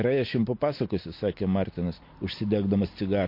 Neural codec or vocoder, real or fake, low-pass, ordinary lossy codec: none; real; 5.4 kHz; MP3, 32 kbps